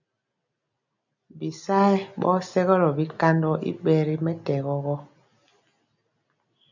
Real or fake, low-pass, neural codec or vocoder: real; 7.2 kHz; none